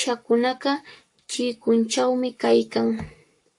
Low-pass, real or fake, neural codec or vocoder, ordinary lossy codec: 10.8 kHz; fake; codec, 44.1 kHz, 7.8 kbps, Pupu-Codec; AAC, 64 kbps